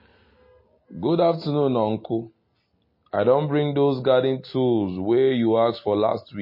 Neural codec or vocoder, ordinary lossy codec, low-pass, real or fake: none; MP3, 24 kbps; 5.4 kHz; real